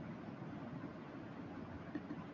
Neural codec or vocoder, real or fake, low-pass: none; real; 7.2 kHz